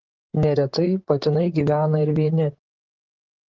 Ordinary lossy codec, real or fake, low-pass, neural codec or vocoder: Opus, 16 kbps; fake; 7.2 kHz; vocoder, 44.1 kHz, 128 mel bands, Pupu-Vocoder